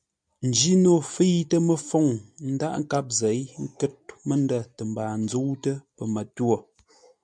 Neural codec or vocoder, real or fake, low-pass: none; real; 9.9 kHz